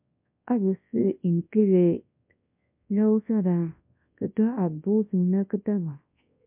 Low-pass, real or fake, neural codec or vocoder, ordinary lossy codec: 3.6 kHz; fake; codec, 24 kHz, 0.9 kbps, WavTokenizer, large speech release; MP3, 32 kbps